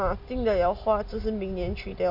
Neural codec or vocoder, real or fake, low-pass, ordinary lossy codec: none; real; 5.4 kHz; none